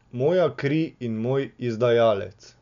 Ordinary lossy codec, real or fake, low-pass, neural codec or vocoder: none; real; 7.2 kHz; none